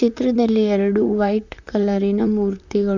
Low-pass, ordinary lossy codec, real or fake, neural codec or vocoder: 7.2 kHz; none; fake; vocoder, 44.1 kHz, 128 mel bands, Pupu-Vocoder